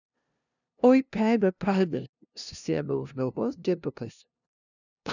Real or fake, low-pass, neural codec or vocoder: fake; 7.2 kHz; codec, 16 kHz, 0.5 kbps, FunCodec, trained on LibriTTS, 25 frames a second